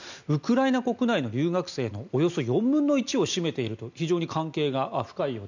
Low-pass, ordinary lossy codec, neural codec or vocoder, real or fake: 7.2 kHz; none; none; real